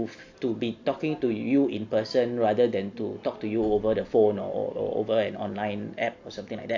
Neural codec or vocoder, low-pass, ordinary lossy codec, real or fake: none; 7.2 kHz; AAC, 48 kbps; real